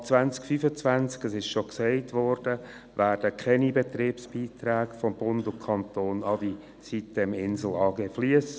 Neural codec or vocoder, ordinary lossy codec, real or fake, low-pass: none; none; real; none